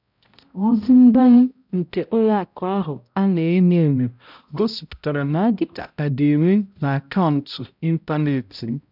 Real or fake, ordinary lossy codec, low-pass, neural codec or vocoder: fake; none; 5.4 kHz; codec, 16 kHz, 0.5 kbps, X-Codec, HuBERT features, trained on balanced general audio